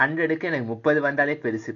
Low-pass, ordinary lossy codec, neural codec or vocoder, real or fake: 7.2 kHz; none; none; real